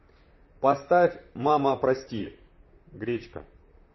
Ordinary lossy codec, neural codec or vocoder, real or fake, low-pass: MP3, 24 kbps; vocoder, 44.1 kHz, 128 mel bands, Pupu-Vocoder; fake; 7.2 kHz